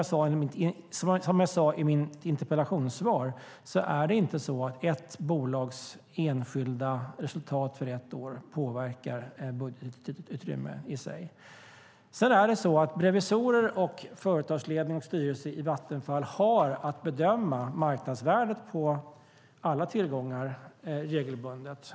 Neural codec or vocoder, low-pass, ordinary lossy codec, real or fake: none; none; none; real